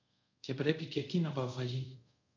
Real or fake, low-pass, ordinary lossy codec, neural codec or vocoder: fake; 7.2 kHz; AAC, 48 kbps; codec, 24 kHz, 0.5 kbps, DualCodec